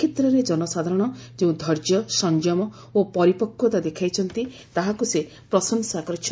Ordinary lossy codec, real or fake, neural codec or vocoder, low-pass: none; real; none; none